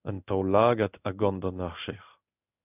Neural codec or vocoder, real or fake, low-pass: codec, 16 kHz in and 24 kHz out, 1 kbps, XY-Tokenizer; fake; 3.6 kHz